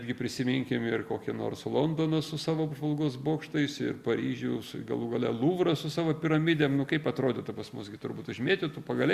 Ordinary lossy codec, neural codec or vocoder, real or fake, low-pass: Opus, 64 kbps; none; real; 14.4 kHz